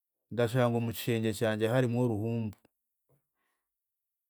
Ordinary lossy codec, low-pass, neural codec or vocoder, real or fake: none; none; none; real